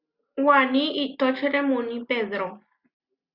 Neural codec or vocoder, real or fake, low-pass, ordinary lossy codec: none; real; 5.4 kHz; Opus, 64 kbps